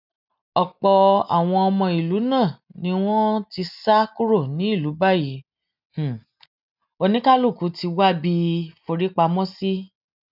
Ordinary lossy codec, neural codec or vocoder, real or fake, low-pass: none; none; real; 5.4 kHz